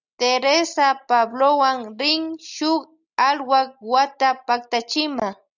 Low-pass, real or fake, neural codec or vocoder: 7.2 kHz; real; none